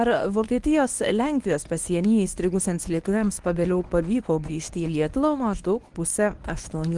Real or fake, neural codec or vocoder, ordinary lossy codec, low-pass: fake; codec, 24 kHz, 0.9 kbps, WavTokenizer, medium speech release version 1; Opus, 24 kbps; 10.8 kHz